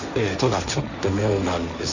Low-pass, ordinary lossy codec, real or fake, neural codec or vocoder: 7.2 kHz; none; fake; codec, 16 kHz, 1.1 kbps, Voila-Tokenizer